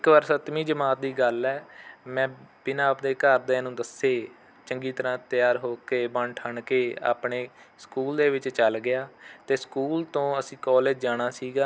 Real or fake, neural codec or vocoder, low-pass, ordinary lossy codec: real; none; none; none